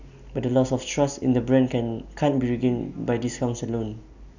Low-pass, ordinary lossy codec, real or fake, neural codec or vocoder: 7.2 kHz; none; real; none